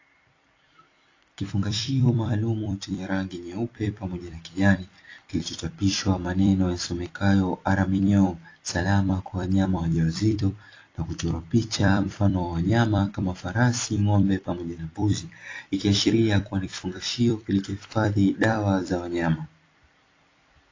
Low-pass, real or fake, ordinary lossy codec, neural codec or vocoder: 7.2 kHz; fake; AAC, 32 kbps; vocoder, 44.1 kHz, 128 mel bands every 256 samples, BigVGAN v2